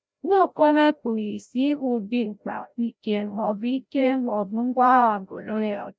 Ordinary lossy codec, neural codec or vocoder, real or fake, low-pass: none; codec, 16 kHz, 0.5 kbps, FreqCodec, larger model; fake; none